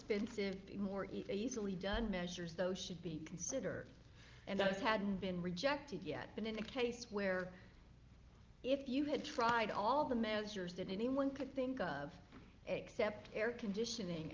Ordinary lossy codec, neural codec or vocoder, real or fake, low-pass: Opus, 32 kbps; none; real; 7.2 kHz